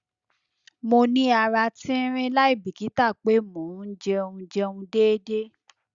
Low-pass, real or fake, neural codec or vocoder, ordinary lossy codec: 7.2 kHz; real; none; Opus, 64 kbps